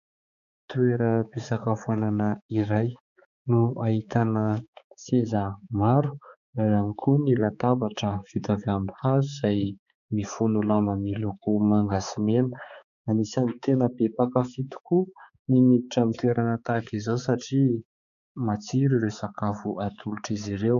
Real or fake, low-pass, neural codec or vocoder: fake; 7.2 kHz; codec, 16 kHz, 4 kbps, X-Codec, HuBERT features, trained on balanced general audio